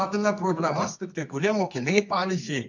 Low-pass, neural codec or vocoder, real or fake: 7.2 kHz; codec, 24 kHz, 0.9 kbps, WavTokenizer, medium music audio release; fake